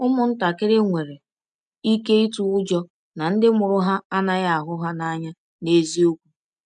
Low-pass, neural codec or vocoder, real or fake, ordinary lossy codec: 9.9 kHz; none; real; MP3, 96 kbps